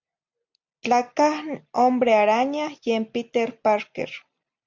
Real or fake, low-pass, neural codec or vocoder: real; 7.2 kHz; none